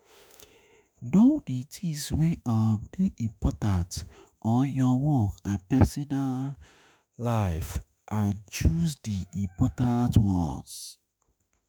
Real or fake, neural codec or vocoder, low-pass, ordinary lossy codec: fake; autoencoder, 48 kHz, 32 numbers a frame, DAC-VAE, trained on Japanese speech; none; none